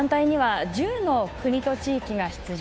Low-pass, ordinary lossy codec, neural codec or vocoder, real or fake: none; none; codec, 16 kHz, 2 kbps, FunCodec, trained on Chinese and English, 25 frames a second; fake